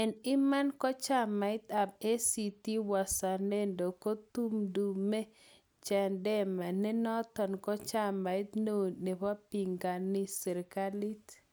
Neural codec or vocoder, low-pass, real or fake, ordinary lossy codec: none; none; real; none